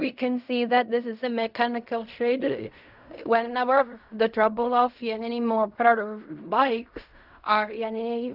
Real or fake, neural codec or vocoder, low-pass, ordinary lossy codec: fake; codec, 16 kHz in and 24 kHz out, 0.4 kbps, LongCat-Audio-Codec, fine tuned four codebook decoder; 5.4 kHz; none